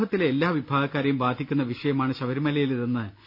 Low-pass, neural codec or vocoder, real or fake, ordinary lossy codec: 5.4 kHz; none; real; none